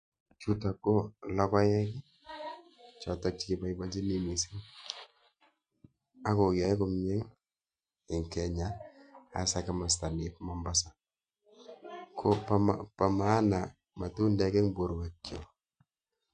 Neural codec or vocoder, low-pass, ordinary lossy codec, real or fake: none; 9.9 kHz; MP3, 64 kbps; real